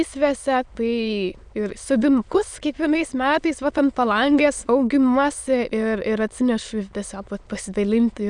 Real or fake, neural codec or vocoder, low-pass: fake; autoencoder, 22.05 kHz, a latent of 192 numbers a frame, VITS, trained on many speakers; 9.9 kHz